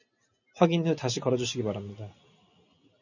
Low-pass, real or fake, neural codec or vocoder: 7.2 kHz; real; none